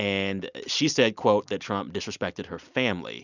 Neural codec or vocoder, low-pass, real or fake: none; 7.2 kHz; real